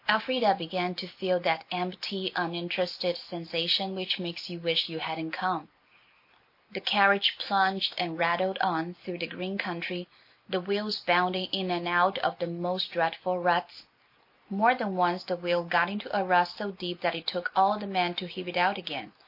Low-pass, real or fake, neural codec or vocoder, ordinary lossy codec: 5.4 kHz; real; none; MP3, 32 kbps